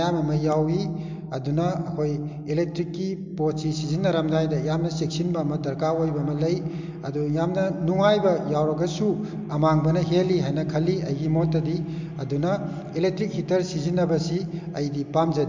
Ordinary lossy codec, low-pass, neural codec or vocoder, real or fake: MP3, 64 kbps; 7.2 kHz; none; real